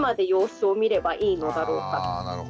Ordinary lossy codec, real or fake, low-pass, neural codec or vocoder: none; real; none; none